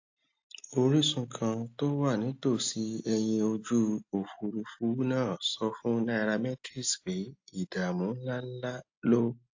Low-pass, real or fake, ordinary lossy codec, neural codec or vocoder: 7.2 kHz; real; AAC, 48 kbps; none